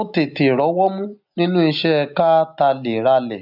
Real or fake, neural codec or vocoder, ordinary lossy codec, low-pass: real; none; none; 5.4 kHz